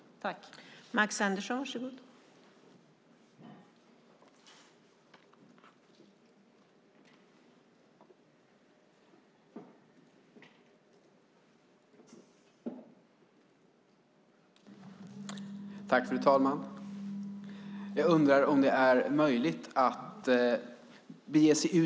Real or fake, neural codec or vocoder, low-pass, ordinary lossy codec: real; none; none; none